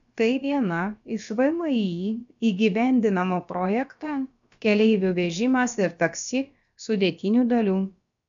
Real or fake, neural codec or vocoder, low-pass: fake; codec, 16 kHz, about 1 kbps, DyCAST, with the encoder's durations; 7.2 kHz